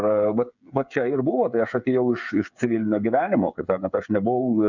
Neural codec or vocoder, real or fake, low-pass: codec, 16 kHz, 8 kbps, FreqCodec, smaller model; fake; 7.2 kHz